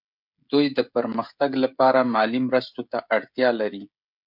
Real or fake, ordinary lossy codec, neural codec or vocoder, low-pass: fake; MP3, 48 kbps; codec, 16 kHz, 16 kbps, FreqCodec, smaller model; 5.4 kHz